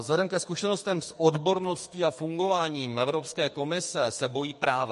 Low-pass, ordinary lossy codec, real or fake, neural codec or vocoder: 14.4 kHz; MP3, 48 kbps; fake; codec, 44.1 kHz, 2.6 kbps, SNAC